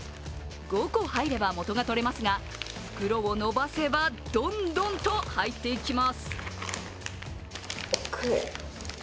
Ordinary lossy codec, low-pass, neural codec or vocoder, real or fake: none; none; none; real